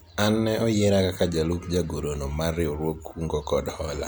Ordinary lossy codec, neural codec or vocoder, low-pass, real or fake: none; none; none; real